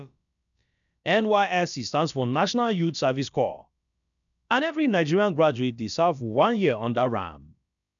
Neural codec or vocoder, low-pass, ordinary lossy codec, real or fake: codec, 16 kHz, about 1 kbps, DyCAST, with the encoder's durations; 7.2 kHz; none; fake